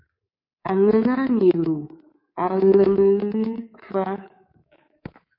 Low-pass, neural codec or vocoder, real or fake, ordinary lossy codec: 5.4 kHz; codec, 24 kHz, 3.1 kbps, DualCodec; fake; MP3, 32 kbps